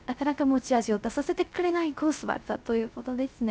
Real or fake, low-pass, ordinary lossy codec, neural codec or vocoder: fake; none; none; codec, 16 kHz, 0.3 kbps, FocalCodec